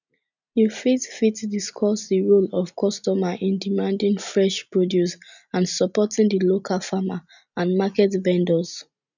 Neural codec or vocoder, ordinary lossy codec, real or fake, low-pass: none; none; real; 7.2 kHz